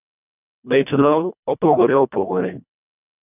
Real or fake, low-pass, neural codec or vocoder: fake; 3.6 kHz; codec, 24 kHz, 1.5 kbps, HILCodec